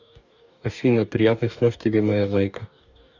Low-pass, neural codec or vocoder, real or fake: 7.2 kHz; codec, 44.1 kHz, 2.6 kbps, DAC; fake